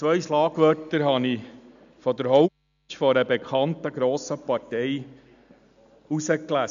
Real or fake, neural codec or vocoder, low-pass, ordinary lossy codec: real; none; 7.2 kHz; AAC, 96 kbps